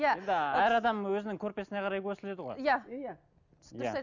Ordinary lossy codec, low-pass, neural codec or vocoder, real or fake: none; 7.2 kHz; none; real